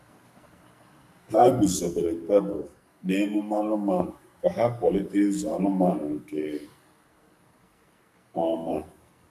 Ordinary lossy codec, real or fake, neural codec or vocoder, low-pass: MP3, 96 kbps; fake; codec, 44.1 kHz, 2.6 kbps, SNAC; 14.4 kHz